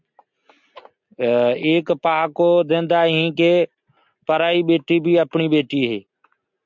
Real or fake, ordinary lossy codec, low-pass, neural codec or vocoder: real; MP3, 64 kbps; 7.2 kHz; none